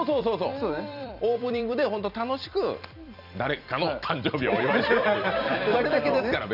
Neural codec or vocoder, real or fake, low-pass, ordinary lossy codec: vocoder, 44.1 kHz, 128 mel bands every 256 samples, BigVGAN v2; fake; 5.4 kHz; none